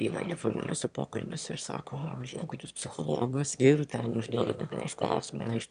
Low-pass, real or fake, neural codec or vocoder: 9.9 kHz; fake; autoencoder, 22.05 kHz, a latent of 192 numbers a frame, VITS, trained on one speaker